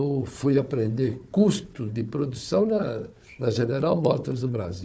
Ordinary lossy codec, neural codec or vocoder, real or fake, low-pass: none; codec, 16 kHz, 4 kbps, FunCodec, trained on Chinese and English, 50 frames a second; fake; none